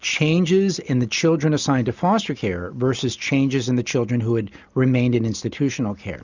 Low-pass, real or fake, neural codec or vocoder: 7.2 kHz; real; none